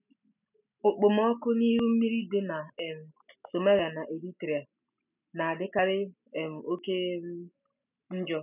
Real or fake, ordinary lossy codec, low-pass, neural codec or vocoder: real; none; 3.6 kHz; none